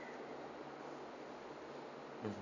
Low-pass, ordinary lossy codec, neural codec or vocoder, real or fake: 7.2 kHz; none; none; real